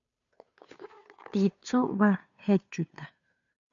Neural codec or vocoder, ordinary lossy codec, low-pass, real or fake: codec, 16 kHz, 2 kbps, FunCodec, trained on Chinese and English, 25 frames a second; MP3, 96 kbps; 7.2 kHz; fake